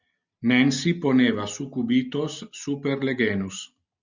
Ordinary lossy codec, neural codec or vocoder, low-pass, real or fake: Opus, 64 kbps; none; 7.2 kHz; real